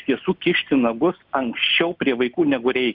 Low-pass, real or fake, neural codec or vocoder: 5.4 kHz; real; none